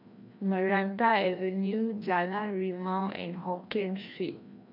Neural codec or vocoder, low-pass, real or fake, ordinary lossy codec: codec, 16 kHz, 1 kbps, FreqCodec, larger model; 5.4 kHz; fake; MP3, 48 kbps